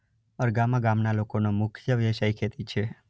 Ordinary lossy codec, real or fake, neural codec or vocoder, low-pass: none; real; none; none